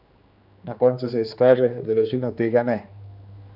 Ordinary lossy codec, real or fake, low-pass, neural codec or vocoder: none; fake; 5.4 kHz; codec, 16 kHz, 2 kbps, X-Codec, HuBERT features, trained on general audio